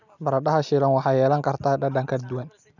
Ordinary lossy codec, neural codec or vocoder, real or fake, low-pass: none; none; real; 7.2 kHz